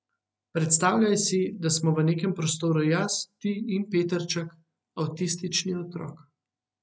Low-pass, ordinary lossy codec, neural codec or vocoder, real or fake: none; none; none; real